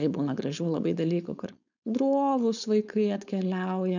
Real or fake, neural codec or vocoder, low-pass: fake; codec, 16 kHz, 4.8 kbps, FACodec; 7.2 kHz